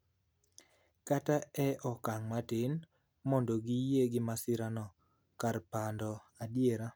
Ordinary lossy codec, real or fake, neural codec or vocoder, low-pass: none; real; none; none